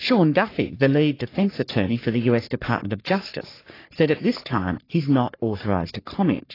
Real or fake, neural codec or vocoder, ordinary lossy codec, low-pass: fake; codec, 44.1 kHz, 3.4 kbps, Pupu-Codec; AAC, 24 kbps; 5.4 kHz